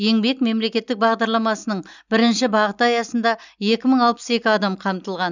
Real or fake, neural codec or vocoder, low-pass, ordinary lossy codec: real; none; 7.2 kHz; none